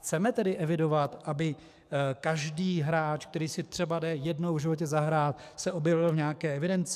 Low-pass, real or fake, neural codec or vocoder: 14.4 kHz; fake; codec, 44.1 kHz, 7.8 kbps, DAC